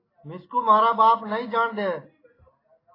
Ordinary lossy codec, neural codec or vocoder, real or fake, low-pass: AAC, 24 kbps; none; real; 5.4 kHz